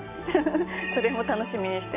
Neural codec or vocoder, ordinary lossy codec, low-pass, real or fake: none; AAC, 24 kbps; 3.6 kHz; real